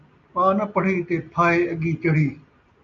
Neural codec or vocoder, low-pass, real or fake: none; 7.2 kHz; real